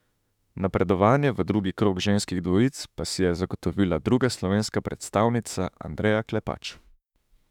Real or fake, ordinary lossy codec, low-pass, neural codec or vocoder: fake; none; 19.8 kHz; autoencoder, 48 kHz, 32 numbers a frame, DAC-VAE, trained on Japanese speech